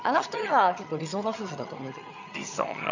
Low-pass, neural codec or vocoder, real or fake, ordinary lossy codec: 7.2 kHz; vocoder, 22.05 kHz, 80 mel bands, HiFi-GAN; fake; none